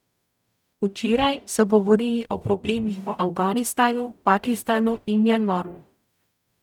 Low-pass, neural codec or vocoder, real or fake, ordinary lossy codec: 19.8 kHz; codec, 44.1 kHz, 0.9 kbps, DAC; fake; none